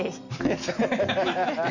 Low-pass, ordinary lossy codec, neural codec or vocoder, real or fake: 7.2 kHz; none; none; real